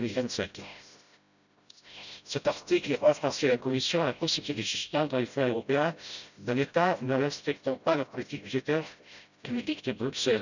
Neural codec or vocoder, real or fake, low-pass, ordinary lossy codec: codec, 16 kHz, 0.5 kbps, FreqCodec, smaller model; fake; 7.2 kHz; none